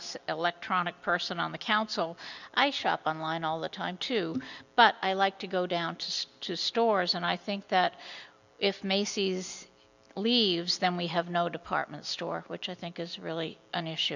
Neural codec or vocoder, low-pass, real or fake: none; 7.2 kHz; real